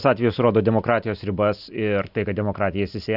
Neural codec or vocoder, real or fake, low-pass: none; real; 5.4 kHz